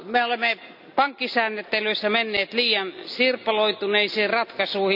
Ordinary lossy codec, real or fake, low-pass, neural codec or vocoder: none; real; 5.4 kHz; none